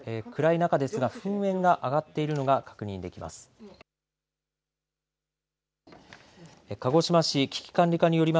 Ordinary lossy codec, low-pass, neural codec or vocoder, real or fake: none; none; none; real